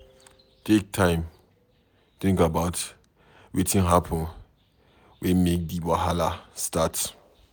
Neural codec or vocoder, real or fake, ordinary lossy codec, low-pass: none; real; none; none